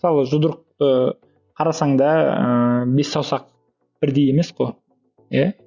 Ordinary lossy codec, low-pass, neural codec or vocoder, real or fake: Opus, 64 kbps; 7.2 kHz; none; real